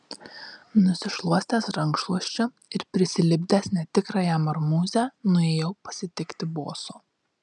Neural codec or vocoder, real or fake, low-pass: none; real; 10.8 kHz